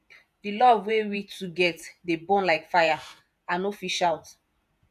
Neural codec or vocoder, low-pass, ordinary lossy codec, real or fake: vocoder, 48 kHz, 128 mel bands, Vocos; 14.4 kHz; none; fake